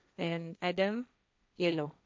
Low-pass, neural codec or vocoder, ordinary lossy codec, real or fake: none; codec, 16 kHz, 1.1 kbps, Voila-Tokenizer; none; fake